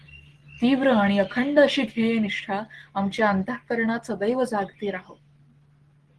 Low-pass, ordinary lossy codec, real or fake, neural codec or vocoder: 10.8 kHz; Opus, 16 kbps; real; none